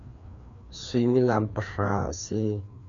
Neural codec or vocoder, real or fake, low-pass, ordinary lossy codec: codec, 16 kHz, 2 kbps, FreqCodec, larger model; fake; 7.2 kHz; MP3, 64 kbps